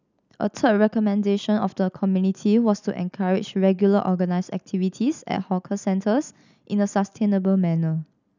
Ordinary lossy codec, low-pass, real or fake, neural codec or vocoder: none; 7.2 kHz; real; none